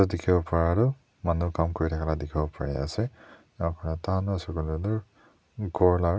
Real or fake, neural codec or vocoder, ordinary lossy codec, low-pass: real; none; none; none